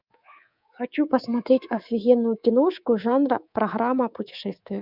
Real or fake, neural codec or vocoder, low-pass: fake; codec, 24 kHz, 3.1 kbps, DualCodec; 5.4 kHz